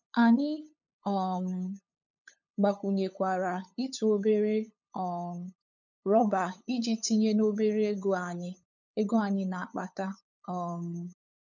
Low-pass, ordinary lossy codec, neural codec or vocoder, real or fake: 7.2 kHz; none; codec, 16 kHz, 8 kbps, FunCodec, trained on LibriTTS, 25 frames a second; fake